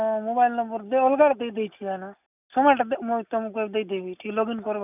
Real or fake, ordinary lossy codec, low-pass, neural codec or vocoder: real; none; 3.6 kHz; none